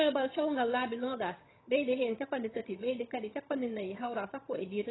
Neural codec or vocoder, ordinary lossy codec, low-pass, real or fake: vocoder, 22.05 kHz, 80 mel bands, HiFi-GAN; AAC, 16 kbps; 7.2 kHz; fake